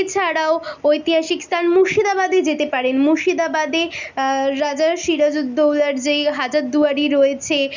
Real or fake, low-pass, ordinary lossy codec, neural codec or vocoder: real; 7.2 kHz; none; none